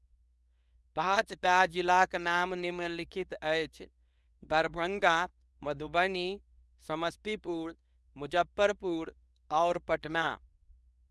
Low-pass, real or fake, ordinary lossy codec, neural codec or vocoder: none; fake; none; codec, 24 kHz, 0.9 kbps, WavTokenizer, medium speech release version 2